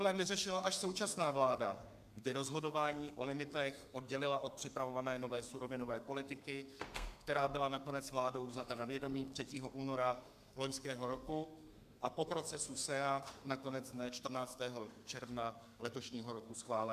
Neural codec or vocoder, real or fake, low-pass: codec, 32 kHz, 1.9 kbps, SNAC; fake; 14.4 kHz